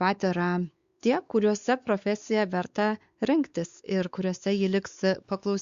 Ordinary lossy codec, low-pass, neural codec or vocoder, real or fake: Opus, 64 kbps; 7.2 kHz; codec, 16 kHz, 4 kbps, X-Codec, WavLM features, trained on Multilingual LibriSpeech; fake